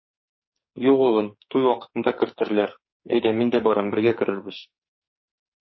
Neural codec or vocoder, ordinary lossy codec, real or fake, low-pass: codec, 44.1 kHz, 2.6 kbps, SNAC; MP3, 24 kbps; fake; 7.2 kHz